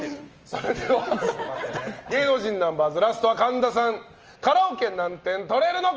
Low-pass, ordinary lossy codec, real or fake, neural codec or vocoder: 7.2 kHz; Opus, 24 kbps; real; none